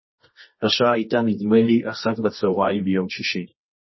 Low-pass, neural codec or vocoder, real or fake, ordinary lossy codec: 7.2 kHz; codec, 24 kHz, 0.9 kbps, WavTokenizer, medium music audio release; fake; MP3, 24 kbps